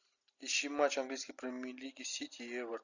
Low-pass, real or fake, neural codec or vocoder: 7.2 kHz; real; none